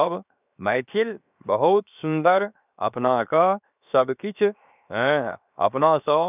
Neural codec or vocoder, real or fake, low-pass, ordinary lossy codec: codec, 16 kHz, 0.7 kbps, FocalCodec; fake; 3.6 kHz; none